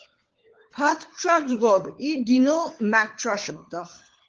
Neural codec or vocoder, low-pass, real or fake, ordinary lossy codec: codec, 16 kHz, 4 kbps, FunCodec, trained on LibriTTS, 50 frames a second; 7.2 kHz; fake; Opus, 16 kbps